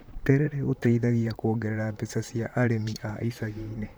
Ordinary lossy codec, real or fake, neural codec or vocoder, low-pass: none; fake; vocoder, 44.1 kHz, 128 mel bands, Pupu-Vocoder; none